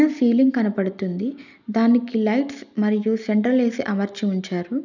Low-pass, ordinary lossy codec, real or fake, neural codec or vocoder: 7.2 kHz; none; real; none